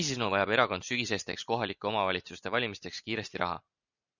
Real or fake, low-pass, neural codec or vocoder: real; 7.2 kHz; none